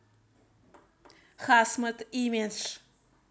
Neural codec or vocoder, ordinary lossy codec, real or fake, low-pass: none; none; real; none